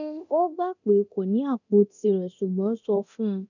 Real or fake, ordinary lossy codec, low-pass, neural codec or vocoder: fake; none; 7.2 kHz; codec, 24 kHz, 0.9 kbps, DualCodec